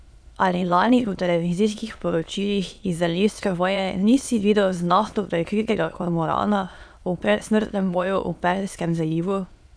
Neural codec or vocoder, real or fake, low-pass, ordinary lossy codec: autoencoder, 22.05 kHz, a latent of 192 numbers a frame, VITS, trained on many speakers; fake; none; none